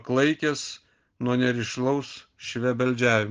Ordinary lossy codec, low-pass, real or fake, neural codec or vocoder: Opus, 16 kbps; 7.2 kHz; real; none